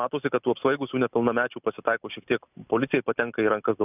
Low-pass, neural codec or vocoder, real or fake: 3.6 kHz; none; real